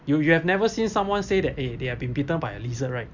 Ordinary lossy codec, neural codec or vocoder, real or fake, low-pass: Opus, 64 kbps; none; real; 7.2 kHz